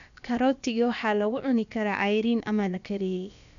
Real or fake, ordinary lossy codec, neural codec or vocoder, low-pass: fake; none; codec, 16 kHz, about 1 kbps, DyCAST, with the encoder's durations; 7.2 kHz